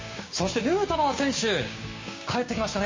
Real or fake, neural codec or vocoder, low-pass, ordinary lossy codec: fake; codec, 16 kHz, 6 kbps, DAC; 7.2 kHz; MP3, 32 kbps